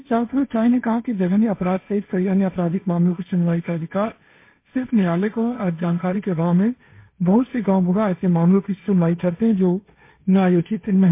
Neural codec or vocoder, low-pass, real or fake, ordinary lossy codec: codec, 16 kHz, 1.1 kbps, Voila-Tokenizer; 3.6 kHz; fake; MP3, 24 kbps